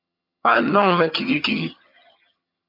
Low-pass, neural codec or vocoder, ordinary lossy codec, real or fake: 5.4 kHz; vocoder, 22.05 kHz, 80 mel bands, HiFi-GAN; MP3, 48 kbps; fake